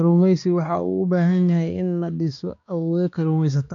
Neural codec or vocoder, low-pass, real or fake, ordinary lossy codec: codec, 16 kHz, 2 kbps, X-Codec, HuBERT features, trained on balanced general audio; 7.2 kHz; fake; AAC, 64 kbps